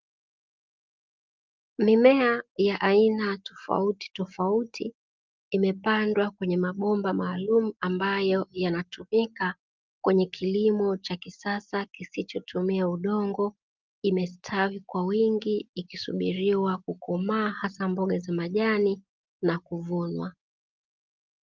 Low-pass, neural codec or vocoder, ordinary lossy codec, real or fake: 7.2 kHz; none; Opus, 32 kbps; real